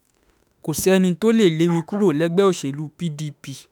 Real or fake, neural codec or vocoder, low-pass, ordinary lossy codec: fake; autoencoder, 48 kHz, 32 numbers a frame, DAC-VAE, trained on Japanese speech; none; none